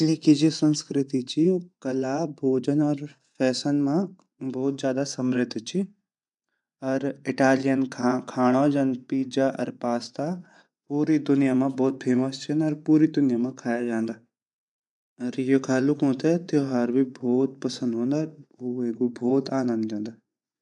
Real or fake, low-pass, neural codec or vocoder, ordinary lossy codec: fake; 10.8 kHz; vocoder, 24 kHz, 100 mel bands, Vocos; none